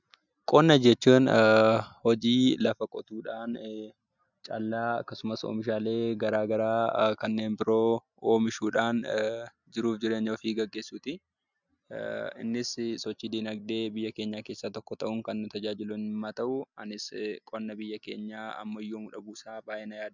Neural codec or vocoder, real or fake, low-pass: none; real; 7.2 kHz